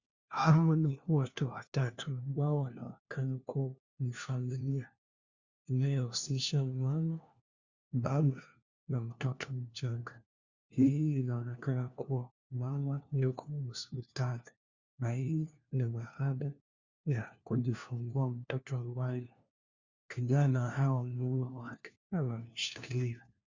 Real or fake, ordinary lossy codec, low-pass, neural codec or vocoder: fake; Opus, 64 kbps; 7.2 kHz; codec, 16 kHz, 1 kbps, FunCodec, trained on LibriTTS, 50 frames a second